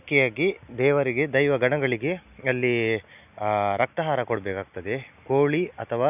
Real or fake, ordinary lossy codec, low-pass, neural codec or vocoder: real; none; 3.6 kHz; none